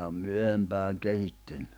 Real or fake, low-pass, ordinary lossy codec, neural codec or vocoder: fake; none; none; codec, 44.1 kHz, 7.8 kbps, DAC